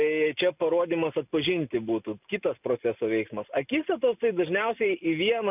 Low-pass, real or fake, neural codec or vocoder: 3.6 kHz; real; none